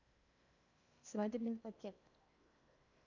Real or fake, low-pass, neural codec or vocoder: fake; 7.2 kHz; codec, 16 kHz in and 24 kHz out, 0.8 kbps, FocalCodec, streaming, 65536 codes